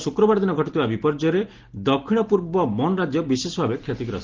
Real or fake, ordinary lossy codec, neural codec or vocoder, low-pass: real; Opus, 16 kbps; none; 7.2 kHz